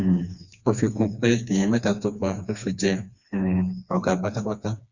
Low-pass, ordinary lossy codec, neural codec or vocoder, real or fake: 7.2 kHz; Opus, 64 kbps; codec, 16 kHz, 2 kbps, FreqCodec, smaller model; fake